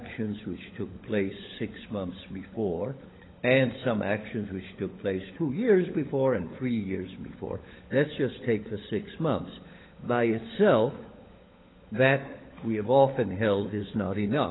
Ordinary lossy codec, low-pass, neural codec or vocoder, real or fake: AAC, 16 kbps; 7.2 kHz; codec, 16 kHz, 16 kbps, FunCodec, trained on LibriTTS, 50 frames a second; fake